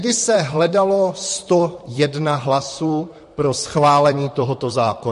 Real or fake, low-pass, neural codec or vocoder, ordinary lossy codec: fake; 14.4 kHz; vocoder, 44.1 kHz, 128 mel bands, Pupu-Vocoder; MP3, 48 kbps